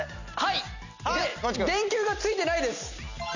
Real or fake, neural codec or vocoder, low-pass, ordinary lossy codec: real; none; 7.2 kHz; none